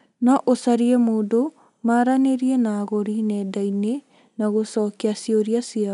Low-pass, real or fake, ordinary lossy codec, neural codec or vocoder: 10.8 kHz; real; none; none